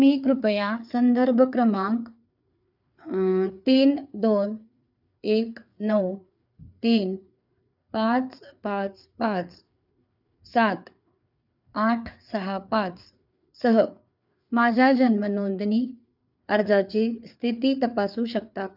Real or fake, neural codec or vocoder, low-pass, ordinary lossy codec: fake; codec, 16 kHz, 4 kbps, FreqCodec, larger model; 5.4 kHz; none